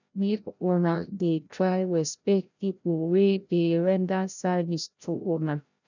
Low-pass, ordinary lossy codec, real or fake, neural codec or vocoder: 7.2 kHz; none; fake; codec, 16 kHz, 0.5 kbps, FreqCodec, larger model